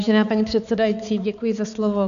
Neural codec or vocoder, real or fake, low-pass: codec, 16 kHz, 4 kbps, X-Codec, HuBERT features, trained on balanced general audio; fake; 7.2 kHz